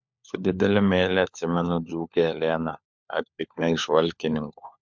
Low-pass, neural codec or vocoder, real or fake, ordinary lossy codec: 7.2 kHz; codec, 16 kHz, 4 kbps, FunCodec, trained on LibriTTS, 50 frames a second; fake; MP3, 64 kbps